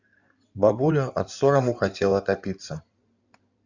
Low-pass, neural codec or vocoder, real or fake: 7.2 kHz; codec, 16 kHz in and 24 kHz out, 2.2 kbps, FireRedTTS-2 codec; fake